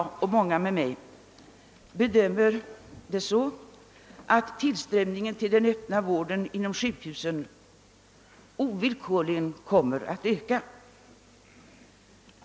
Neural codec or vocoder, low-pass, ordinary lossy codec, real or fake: none; none; none; real